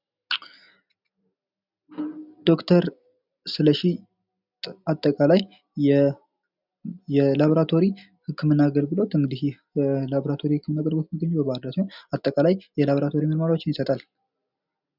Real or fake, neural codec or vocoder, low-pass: real; none; 5.4 kHz